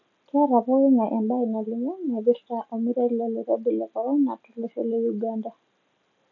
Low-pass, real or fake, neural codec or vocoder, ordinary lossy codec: 7.2 kHz; real; none; none